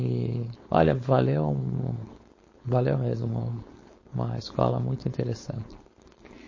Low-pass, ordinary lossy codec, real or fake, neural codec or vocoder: 7.2 kHz; MP3, 32 kbps; fake; codec, 16 kHz, 4.8 kbps, FACodec